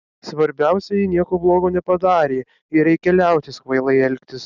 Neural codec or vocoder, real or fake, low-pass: none; real; 7.2 kHz